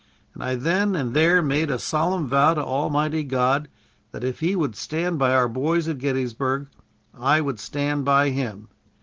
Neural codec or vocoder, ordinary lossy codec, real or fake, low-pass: none; Opus, 16 kbps; real; 7.2 kHz